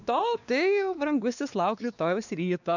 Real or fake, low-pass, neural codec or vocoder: fake; 7.2 kHz; codec, 16 kHz, 2 kbps, X-Codec, WavLM features, trained on Multilingual LibriSpeech